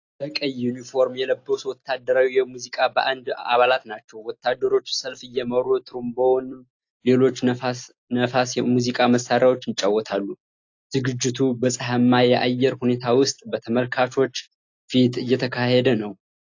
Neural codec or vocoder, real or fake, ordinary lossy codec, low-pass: none; real; AAC, 48 kbps; 7.2 kHz